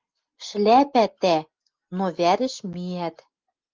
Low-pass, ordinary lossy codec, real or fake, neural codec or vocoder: 7.2 kHz; Opus, 32 kbps; real; none